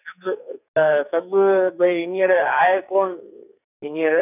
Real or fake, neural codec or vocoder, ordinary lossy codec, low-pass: fake; codec, 44.1 kHz, 2.6 kbps, SNAC; none; 3.6 kHz